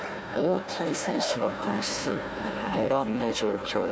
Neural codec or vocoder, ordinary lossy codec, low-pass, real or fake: codec, 16 kHz, 1 kbps, FunCodec, trained on Chinese and English, 50 frames a second; none; none; fake